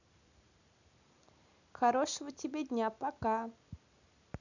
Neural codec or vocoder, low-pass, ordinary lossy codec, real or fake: none; 7.2 kHz; none; real